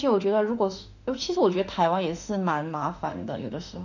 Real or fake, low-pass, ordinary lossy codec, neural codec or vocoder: fake; 7.2 kHz; none; autoencoder, 48 kHz, 32 numbers a frame, DAC-VAE, trained on Japanese speech